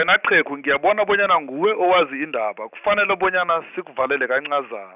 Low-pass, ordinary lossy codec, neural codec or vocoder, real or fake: 3.6 kHz; none; none; real